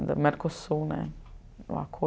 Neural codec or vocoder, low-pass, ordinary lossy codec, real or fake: none; none; none; real